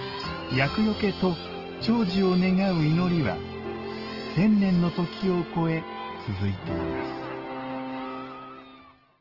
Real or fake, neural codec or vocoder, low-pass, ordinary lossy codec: real; none; 5.4 kHz; Opus, 16 kbps